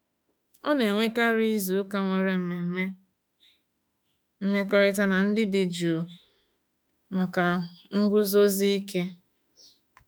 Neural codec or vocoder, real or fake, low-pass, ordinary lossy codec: autoencoder, 48 kHz, 32 numbers a frame, DAC-VAE, trained on Japanese speech; fake; none; none